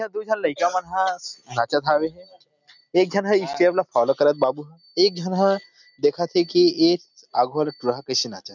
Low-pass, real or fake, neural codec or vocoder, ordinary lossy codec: 7.2 kHz; real; none; none